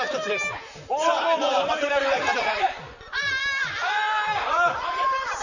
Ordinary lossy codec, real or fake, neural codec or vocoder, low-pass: none; fake; vocoder, 44.1 kHz, 128 mel bands, Pupu-Vocoder; 7.2 kHz